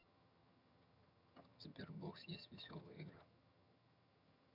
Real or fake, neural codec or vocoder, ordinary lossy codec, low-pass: fake; vocoder, 22.05 kHz, 80 mel bands, HiFi-GAN; none; 5.4 kHz